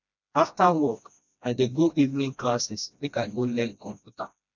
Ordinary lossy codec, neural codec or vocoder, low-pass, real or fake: none; codec, 16 kHz, 1 kbps, FreqCodec, smaller model; 7.2 kHz; fake